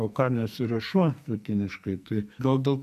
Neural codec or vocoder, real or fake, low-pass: codec, 44.1 kHz, 2.6 kbps, SNAC; fake; 14.4 kHz